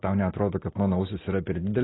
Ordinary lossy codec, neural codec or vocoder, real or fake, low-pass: AAC, 16 kbps; none; real; 7.2 kHz